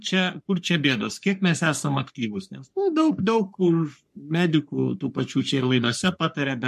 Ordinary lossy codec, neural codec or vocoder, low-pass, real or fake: MP3, 64 kbps; codec, 44.1 kHz, 3.4 kbps, Pupu-Codec; 14.4 kHz; fake